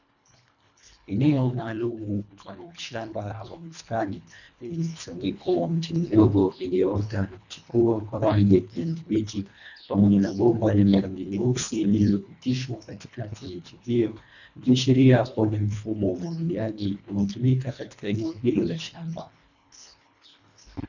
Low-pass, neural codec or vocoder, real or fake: 7.2 kHz; codec, 24 kHz, 1.5 kbps, HILCodec; fake